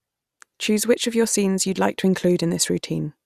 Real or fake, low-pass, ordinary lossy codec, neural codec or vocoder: real; 14.4 kHz; Opus, 64 kbps; none